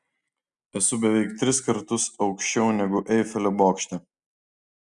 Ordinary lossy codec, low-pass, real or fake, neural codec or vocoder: Opus, 64 kbps; 10.8 kHz; real; none